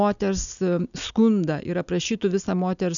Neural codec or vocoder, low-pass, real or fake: none; 7.2 kHz; real